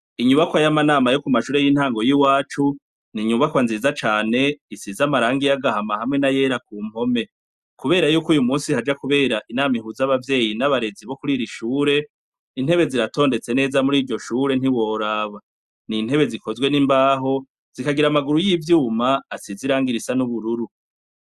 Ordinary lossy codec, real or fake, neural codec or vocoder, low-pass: Opus, 64 kbps; real; none; 14.4 kHz